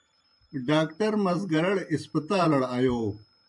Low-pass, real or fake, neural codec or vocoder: 10.8 kHz; fake; vocoder, 44.1 kHz, 128 mel bands every 512 samples, BigVGAN v2